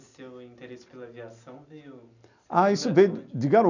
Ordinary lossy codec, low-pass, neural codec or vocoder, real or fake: none; 7.2 kHz; none; real